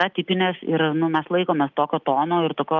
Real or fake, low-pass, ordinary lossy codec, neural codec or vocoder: real; 7.2 kHz; Opus, 24 kbps; none